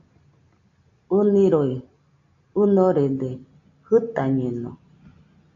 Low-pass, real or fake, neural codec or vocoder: 7.2 kHz; real; none